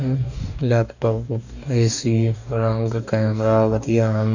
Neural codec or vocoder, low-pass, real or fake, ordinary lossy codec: codec, 44.1 kHz, 2.6 kbps, DAC; 7.2 kHz; fake; none